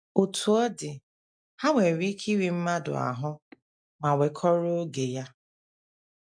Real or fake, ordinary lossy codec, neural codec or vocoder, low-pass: real; MP3, 64 kbps; none; 9.9 kHz